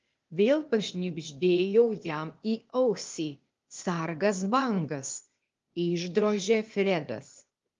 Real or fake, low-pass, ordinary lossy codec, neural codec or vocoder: fake; 7.2 kHz; Opus, 32 kbps; codec, 16 kHz, 0.8 kbps, ZipCodec